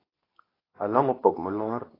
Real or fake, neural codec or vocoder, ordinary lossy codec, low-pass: fake; codec, 24 kHz, 0.9 kbps, WavTokenizer, medium speech release version 2; AAC, 24 kbps; 5.4 kHz